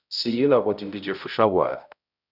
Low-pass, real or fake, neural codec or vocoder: 5.4 kHz; fake; codec, 16 kHz, 0.5 kbps, X-Codec, HuBERT features, trained on balanced general audio